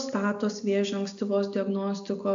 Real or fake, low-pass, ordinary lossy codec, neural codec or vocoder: real; 7.2 kHz; AAC, 64 kbps; none